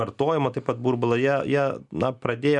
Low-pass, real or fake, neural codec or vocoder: 10.8 kHz; real; none